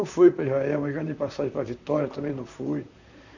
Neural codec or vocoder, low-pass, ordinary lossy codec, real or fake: vocoder, 44.1 kHz, 128 mel bands, Pupu-Vocoder; 7.2 kHz; none; fake